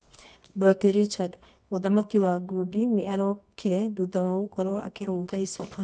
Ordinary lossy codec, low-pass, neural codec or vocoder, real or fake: none; none; codec, 24 kHz, 0.9 kbps, WavTokenizer, medium music audio release; fake